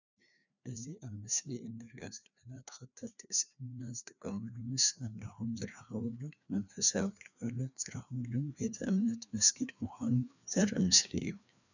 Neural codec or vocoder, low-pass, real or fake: codec, 16 kHz, 2 kbps, FreqCodec, larger model; 7.2 kHz; fake